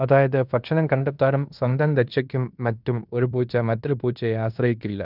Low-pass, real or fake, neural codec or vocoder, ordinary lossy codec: 5.4 kHz; fake; codec, 16 kHz in and 24 kHz out, 0.9 kbps, LongCat-Audio-Codec, fine tuned four codebook decoder; none